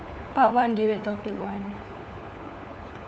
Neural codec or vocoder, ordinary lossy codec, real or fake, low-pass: codec, 16 kHz, 4 kbps, FunCodec, trained on LibriTTS, 50 frames a second; none; fake; none